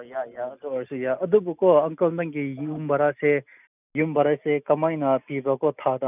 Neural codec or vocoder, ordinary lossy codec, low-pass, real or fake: none; none; 3.6 kHz; real